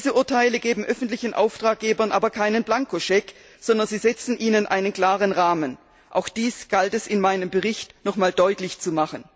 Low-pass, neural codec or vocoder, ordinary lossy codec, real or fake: none; none; none; real